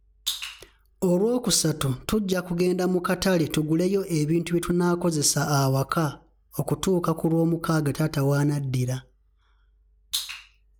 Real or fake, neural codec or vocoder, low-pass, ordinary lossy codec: real; none; none; none